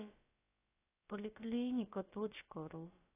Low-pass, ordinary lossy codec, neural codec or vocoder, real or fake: 3.6 kHz; AAC, 16 kbps; codec, 16 kHz, about 1 kbps, DyCAST, with the encoder's durations; fake